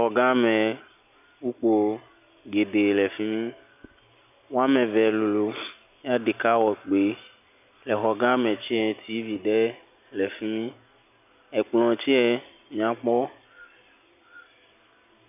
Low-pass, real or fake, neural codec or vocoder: 3.6 kHz; real; none